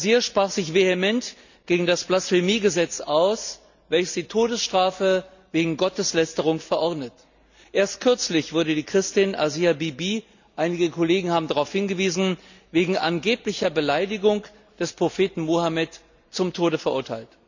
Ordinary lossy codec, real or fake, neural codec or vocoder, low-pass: none; real; none; 7.2 kHz